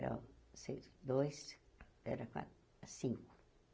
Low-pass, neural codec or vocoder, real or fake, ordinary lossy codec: none; none; real; none